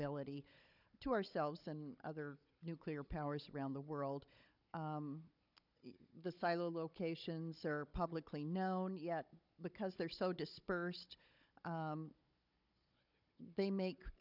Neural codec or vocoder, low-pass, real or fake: codec, 16 kHz, 16 kbps, FunCodec, trained on Chinese and English, 50 frames a second; 5.4 kHz; fake